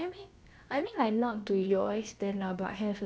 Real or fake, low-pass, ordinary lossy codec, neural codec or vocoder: fake; none; none; codec, 16 kHz, about 1 kbps, DyCAST, with the encoder's durations